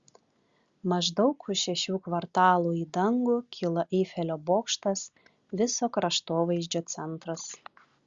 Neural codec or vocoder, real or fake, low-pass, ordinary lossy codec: none; real; 7.2 kHz; Opus, 64 kbps